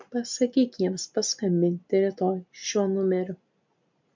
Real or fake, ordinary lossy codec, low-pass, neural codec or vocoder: real; MP3, 64 kbps; 7.2 kHz; none